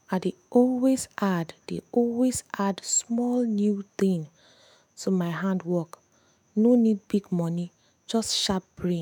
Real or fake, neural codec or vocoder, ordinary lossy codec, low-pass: fake; autoencoder, 48 kHz, 128 numbers a frame, DAC-VAE, trained on Japanese speech; none; 19.8 kHz